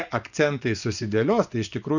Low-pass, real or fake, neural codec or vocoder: 7.2 kHz; fake; vocoder, 24 kHz, 100 mel bands, Vocos